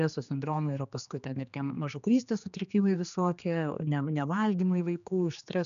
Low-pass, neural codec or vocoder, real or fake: 7.2 kHz; codec, 16 kHz, 2 kbps, X-Codec, HuBERT features, trained on general audio; fake